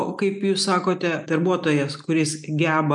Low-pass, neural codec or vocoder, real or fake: 10.8 kHz; none; real